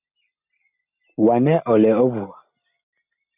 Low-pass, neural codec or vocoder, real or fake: 3.6 kHz; none; real